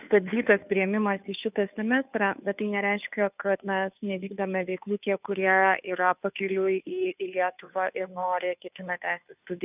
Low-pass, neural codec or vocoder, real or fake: 3.6 kHz; codec, 16 kHz, 2 kbps, FunCodec, trained on Chinese and English, 25 frames a second; fake